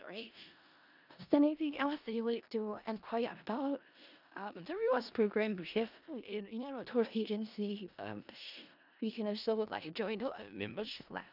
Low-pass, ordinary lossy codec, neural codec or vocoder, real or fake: 5.4 kHz; none; codec, 16 kHz in and 24 kHz out, 0.4 kbps, LongCat-Audio-Codec, four codebook decoder; fake